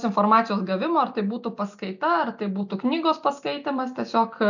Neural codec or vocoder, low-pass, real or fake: none; 7.2 kHz; real